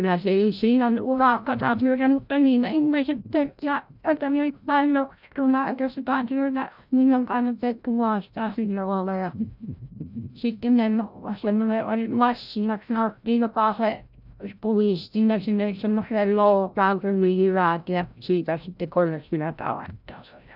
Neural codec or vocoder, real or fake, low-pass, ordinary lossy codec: codec, 16 kHz, 0.5 kbps, FreqCodec, larger model; fake; 5.4 kHz; none